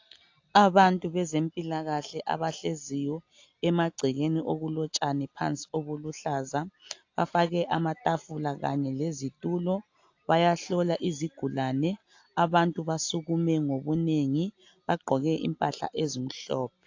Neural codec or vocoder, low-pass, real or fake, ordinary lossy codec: none; 7.2 kHz; real; AAC, 48 kbps